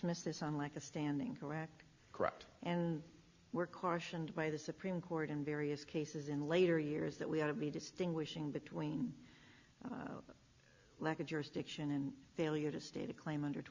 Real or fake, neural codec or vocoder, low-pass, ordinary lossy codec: real; none; 7.2 kHz; Opus, 64 kbps